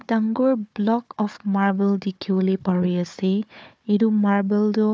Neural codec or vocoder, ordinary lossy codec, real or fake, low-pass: codec, 16 kHz, 4 kbps, FunCodec, trained on Chinese and English, 50 frames a second; none; fake; none